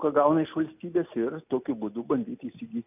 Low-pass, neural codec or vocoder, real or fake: 3.6 kHz; none; real